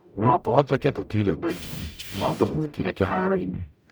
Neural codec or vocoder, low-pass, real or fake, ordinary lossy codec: codec, 44.1 kHz, 0.9 kbps, DAC; none; fake; none